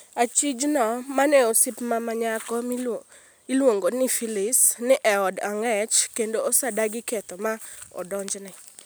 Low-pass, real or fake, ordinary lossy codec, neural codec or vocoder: none; real; none; none